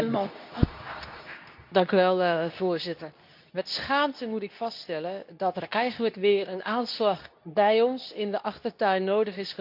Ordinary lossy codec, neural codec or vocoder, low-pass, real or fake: none; codec, 24 kHz, 0.9 kbps, WavTokenizer, medium speech release version 2; 5.4 kHz; fake